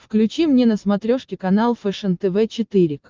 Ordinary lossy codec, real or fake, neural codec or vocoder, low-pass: Opus, 24 kbps; real; none; 7.2 kHz